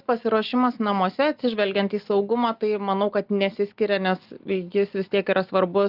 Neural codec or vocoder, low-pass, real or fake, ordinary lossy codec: none; 5.4 kHz; real; Opus, 32 kbps